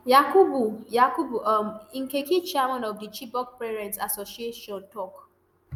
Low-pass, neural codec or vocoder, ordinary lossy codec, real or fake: none; none; none; real